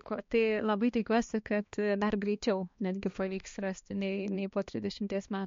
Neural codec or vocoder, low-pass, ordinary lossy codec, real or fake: codec, 16 kHz, 2 kbps, X-Codec, HuBERT features, trained on balanced general audio; 7.2 kHz; MP3, 48 kbps; fake